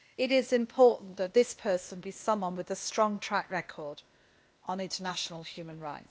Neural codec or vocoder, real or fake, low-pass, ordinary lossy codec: codec, 16 kHz, 0.8 kbps, ZipCodec; fake; none; none